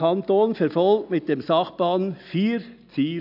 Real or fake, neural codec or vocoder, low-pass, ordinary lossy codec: real; none; 5.4 kHz; none